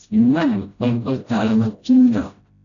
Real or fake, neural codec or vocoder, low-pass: fake; codec, 16 kHz, 0.5 kbps, FreqCodec, smaller model; 7.2 kHz